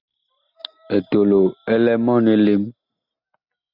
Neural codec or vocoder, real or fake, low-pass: none; real; 5.4 kHz